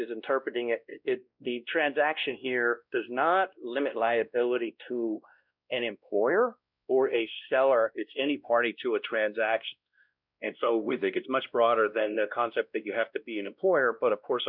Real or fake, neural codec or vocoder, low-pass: fake; codec, 16 kHz, 1 kbps, X-Codec, WavLM features, trained on Multilingual LibriSpeech; 5.4 kHz